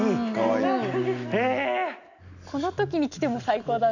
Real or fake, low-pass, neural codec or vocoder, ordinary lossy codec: real; 7.2 kHz; none; none